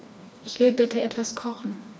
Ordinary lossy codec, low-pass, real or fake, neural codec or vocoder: none; none; fake; codec, 16 kHz, 2 kbps, FreqCodec, smaller model